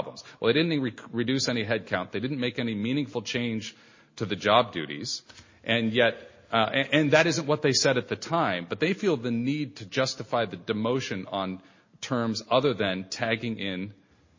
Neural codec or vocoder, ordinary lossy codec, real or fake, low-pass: none; MP3, 32 kbps; real; 7.2 kHz